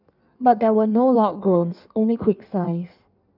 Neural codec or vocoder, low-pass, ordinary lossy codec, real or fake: codec, 16 kHz in and 24 kHz out, 1.1 kbps, FireRedTTS-2 codec; 5.4 kHz; none; fake